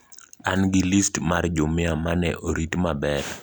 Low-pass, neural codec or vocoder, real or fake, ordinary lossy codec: none; none; real; none